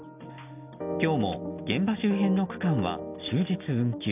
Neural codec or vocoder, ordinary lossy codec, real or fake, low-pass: none; none; real; 3.6 kHz